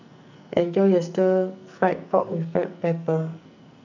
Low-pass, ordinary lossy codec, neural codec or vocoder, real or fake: 7.2 kHz; none; codec, 44.1 kHz, 2.6 kbps, SNAC; fake